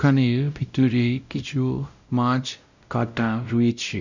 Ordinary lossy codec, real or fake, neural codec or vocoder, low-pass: none; fake; codec, 16 kHz, 0.5 kbps, X-Codec, WavLM features, trained on Multilingual LibriSpeech; 7.2 kHz